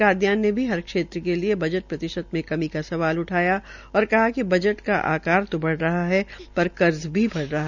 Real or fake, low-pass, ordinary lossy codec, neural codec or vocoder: real; 7.2 kHz; none; none